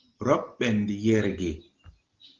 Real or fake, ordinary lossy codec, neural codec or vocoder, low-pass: real; Opus, 16 kbps; none; 7.2 kHz